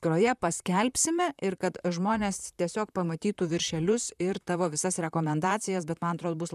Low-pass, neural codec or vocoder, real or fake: 14.4 kHz; vocoder, 44.1 kHz, 128 mel bands, Pupu-Vocoder; fake